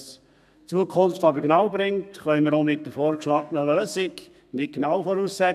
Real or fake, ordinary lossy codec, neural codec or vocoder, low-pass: fake; none; codec, 32 kHz, 1.9 kbps, SNAC; 14.4 kHz